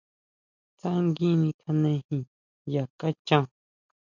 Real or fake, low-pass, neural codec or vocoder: real; 7.2 kHz; none